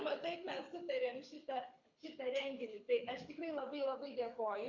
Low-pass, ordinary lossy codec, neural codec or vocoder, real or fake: 7.2 kHz; MP3, 48 kbps; codec, 24 kHz, 6 kbps, HILCodec; fake